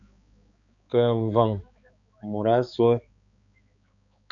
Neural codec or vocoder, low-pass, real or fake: codec, 16 kHz, 4 kbps, X-Codec, HuBERT features, trained on balanced general audio; 7.2 kHz; fake